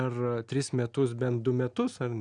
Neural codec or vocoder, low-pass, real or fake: none; 9.9 kHz; real